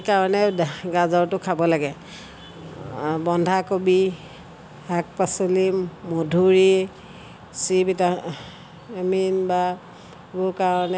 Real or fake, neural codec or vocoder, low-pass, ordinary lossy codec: real; none; none; none